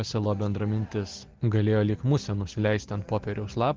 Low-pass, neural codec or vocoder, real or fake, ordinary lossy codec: 7.2 kHz; none; real; Opus, 16 kbps